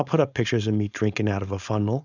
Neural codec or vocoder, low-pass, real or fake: codec, 16 kHz, 4.8 kbps, FACodec; 7.2 kHz; fake